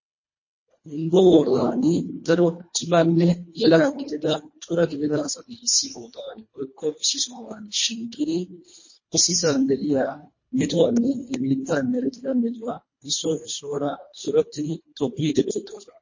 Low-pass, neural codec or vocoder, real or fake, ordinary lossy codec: 7.2 kHz; codec, 24 kHz, 1.5 kbps, HILCodec; fake; MP3, 32 kbps